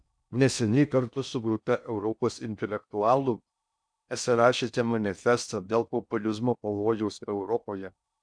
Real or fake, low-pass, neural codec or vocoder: fake; 9.9 kHz; codec, 16 kHz in and 24 kHz out, 0.6 kbps, FocalCodec, streaming, 2048 codes